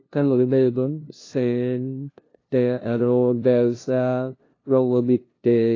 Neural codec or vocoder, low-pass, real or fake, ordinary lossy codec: codec, 16 kHz, 0.5 kbps, FunCodec, trained on LibriTTS, 25 frames a second; 7.2 kHz; fake; AAC, 32 kbps